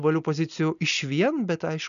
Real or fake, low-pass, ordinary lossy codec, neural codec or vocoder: real; 7.2 kHz; MP3, 96 kbps; none